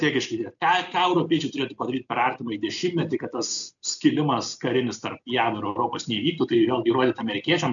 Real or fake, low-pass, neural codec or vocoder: real; 7.2 kHz; none